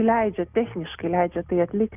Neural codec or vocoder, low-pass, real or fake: none; 3.6 kHz; real